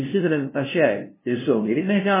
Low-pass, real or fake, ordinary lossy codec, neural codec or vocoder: 3.6 kHz; fake; MP3, 16 kbps; codec, 16 kHz, 0.5 kbps, FunCodec, trained on LibriTTS, 25 frames a second